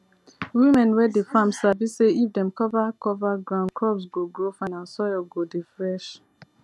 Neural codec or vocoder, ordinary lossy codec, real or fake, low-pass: none; none; real; none